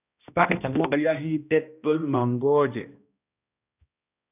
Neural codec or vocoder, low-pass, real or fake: codec, 16 kHz, 1 kbps, X-Codec, HuBERT features, trained on balanced general audio; 3.6 kHz; fake